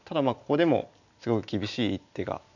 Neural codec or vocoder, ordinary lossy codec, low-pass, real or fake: none; none; 7.2 kHz; real